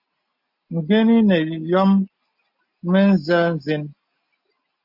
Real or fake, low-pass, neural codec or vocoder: real; 5.4 kHz; none